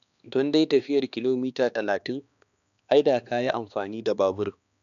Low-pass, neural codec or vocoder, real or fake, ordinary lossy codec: 7.2 kHz; codec, 16 kHz, 2 kbps, X-Codec, HuBERT features, trained on balanced general audio; fake; none